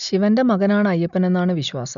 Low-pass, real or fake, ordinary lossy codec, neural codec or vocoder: 7.2 kHz; real; none; none